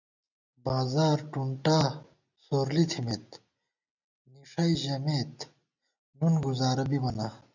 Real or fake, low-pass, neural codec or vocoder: real; 7.2 kHz; none